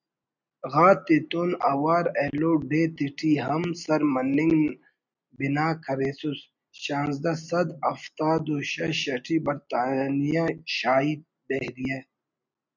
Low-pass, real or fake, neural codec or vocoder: 7.2 kHz; real; none